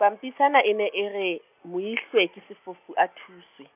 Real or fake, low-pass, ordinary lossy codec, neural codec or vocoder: real; 3.6 kHz; none; none